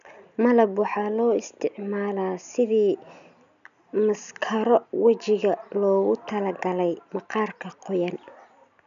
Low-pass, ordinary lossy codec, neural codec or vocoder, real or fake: 7.2 kHz; none; none; real